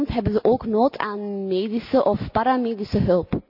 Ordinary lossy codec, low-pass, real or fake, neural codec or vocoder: none; 5.4 kHz; real; none